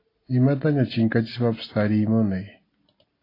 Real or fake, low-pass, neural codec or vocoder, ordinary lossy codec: real; 5.4 kHz; none; AAC, 24 kbps